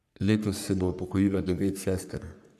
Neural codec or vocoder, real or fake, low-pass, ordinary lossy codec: codec, 44.1 kHz, 3.4 kbps, Pupu-Codec; fake; 14.4 kHz; none